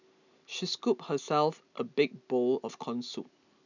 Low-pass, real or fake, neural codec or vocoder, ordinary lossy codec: 7.2 kHz; real; none; none